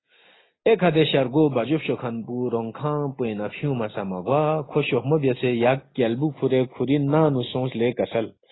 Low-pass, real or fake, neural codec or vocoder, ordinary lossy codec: 7.2 kHz; real; none; AAC, 16 kbps